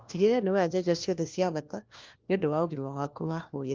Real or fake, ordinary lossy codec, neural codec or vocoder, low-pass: fake; Opus, 24 kbps; codec, 16 kHz, 1 kbps, FunCodec, trained on LibriTTS, 50 frames a second; 7.2 kHz